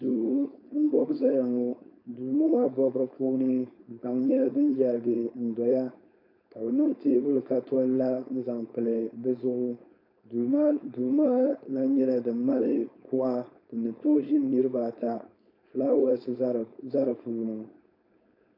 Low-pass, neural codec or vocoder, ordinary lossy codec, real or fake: 5.4 kHz; codec, 16 kHz, 4.8 kbps, FACodec; AAC, 48 kbps; fake